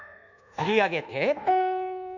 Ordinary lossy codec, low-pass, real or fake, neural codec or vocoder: none; 7.2 kHz; fake; codec, 24 kHz, 1.2 kbps, DualCodec